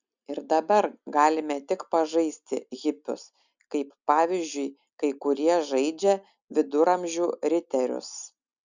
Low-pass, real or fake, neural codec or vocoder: 7.2 kHz; real; none